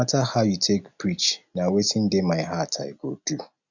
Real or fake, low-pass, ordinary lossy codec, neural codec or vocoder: real; 7.2 kHz; none; none